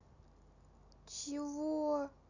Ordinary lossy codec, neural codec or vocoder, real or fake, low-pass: none; none; real; 7.2 kHz